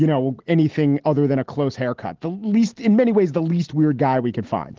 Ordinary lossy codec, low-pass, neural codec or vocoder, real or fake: Opus, 16 kbps; 7.2 kHz; none; real